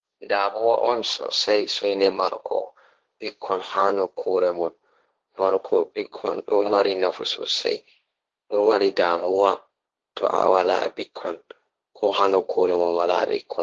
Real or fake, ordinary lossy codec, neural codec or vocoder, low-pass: fake; Opus, 16 kbps; codec, 16 kHz, 1.1 kbps, Voila-Tokenizer; 7.2 kHz